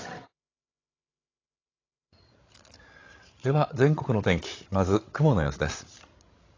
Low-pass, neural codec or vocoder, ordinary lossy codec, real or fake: 7.2 kHz; codec, 16 kHz, 16 kbps, FreqCodec, larger model; AAC, 32 kbps; fake